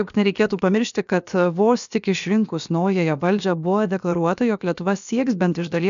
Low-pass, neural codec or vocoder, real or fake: 7.2 kHz; codec, 16 kHz, about 1 kbps, DyCAST, with the encoder's durations; fake